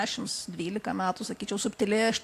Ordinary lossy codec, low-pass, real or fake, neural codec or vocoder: AAC, 64 kbps; 14.4 kHz; real; none